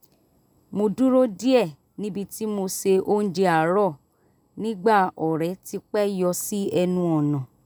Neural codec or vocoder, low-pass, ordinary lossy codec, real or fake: none; none; none; real